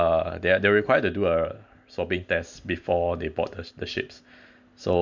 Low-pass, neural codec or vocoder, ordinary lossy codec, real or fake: 7.2 kHz; none; MP3, 64 kbps; real